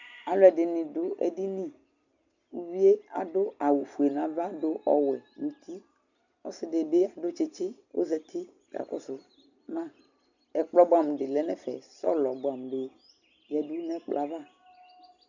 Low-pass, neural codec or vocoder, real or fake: 7.2 kHz; none; real